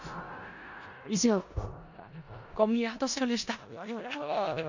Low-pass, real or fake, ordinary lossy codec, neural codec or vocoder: 7.2 kHz; fake; none; codec, 16 kHz in and 24 kHz out, 0.4 kbps, LongCat-Audio-Codec, four codebook decoder